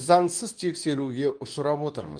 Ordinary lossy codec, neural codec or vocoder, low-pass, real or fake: Opus, 32 kbps; codec, 24 kHz, 0.9 kbps, WavTokenizer, medium speech release version 2; 9.9 kHz; fake